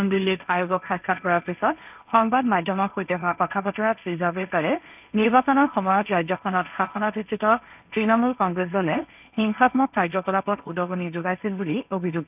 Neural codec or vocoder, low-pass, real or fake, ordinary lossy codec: codec, 16 kHz, 1.1 kbps, Voila-Tokenizer; 3.6 kHz; fake; none